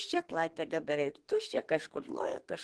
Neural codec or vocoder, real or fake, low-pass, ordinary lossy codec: codec, 32 kHz, 1.9 kbps, SNAC; fake; 10.8 kHz; Opus, 16 kbps